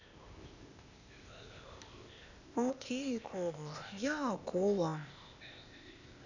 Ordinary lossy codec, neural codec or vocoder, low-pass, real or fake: none; codec, 16 kHz, 0.8 kbps, ZipCodec; 7.2 kHz; fake